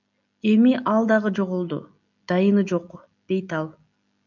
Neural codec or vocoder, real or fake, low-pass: none; real; 7.2 kHz